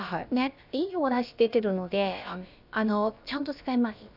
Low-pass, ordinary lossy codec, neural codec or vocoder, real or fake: 5.4 kHz; none; codec, 16 kHz, about 1 kbps, DyCAST, with the encoder's durations; fake